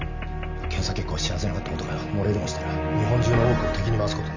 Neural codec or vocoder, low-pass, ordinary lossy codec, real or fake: none; 7.2 kHz; none; real